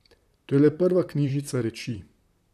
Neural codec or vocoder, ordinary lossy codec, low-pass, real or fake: vocoder, 44.1 kHz, 128 mel bands, Pupu-Vocoder; none; 14.4 kHz; fake